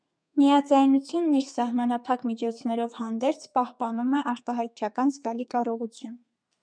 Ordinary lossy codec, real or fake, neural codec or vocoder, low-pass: MP3, 96 kbps; fake; codec, 32 kHz, 1.9 kbps, SNAC; 9.9 kHz